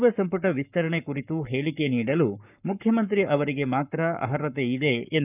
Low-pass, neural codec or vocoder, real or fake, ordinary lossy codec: 3.6 kHz; codec, 44.1 kHz, 7.8 kbps, Pupu-Codec; fake; none